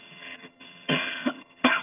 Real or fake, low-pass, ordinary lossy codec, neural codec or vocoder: fake; 3.6 kHz; none; vocoder, 22.05 kHz, 80 mel bands, HiFi-GAN